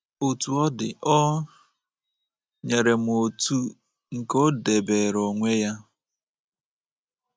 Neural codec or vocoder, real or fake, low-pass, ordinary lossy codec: none; real; none; none